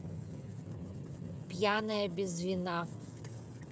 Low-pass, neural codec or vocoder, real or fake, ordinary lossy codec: none; codec, 16 kHz, 16 kbps, FreqCodec, smaller model; fake; none